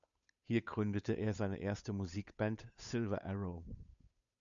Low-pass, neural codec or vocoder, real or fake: 7.2 kHz; codec, 16 kHz, 8 kbps, FunCodec, trained on Chinese and English, 25 frames a second; fake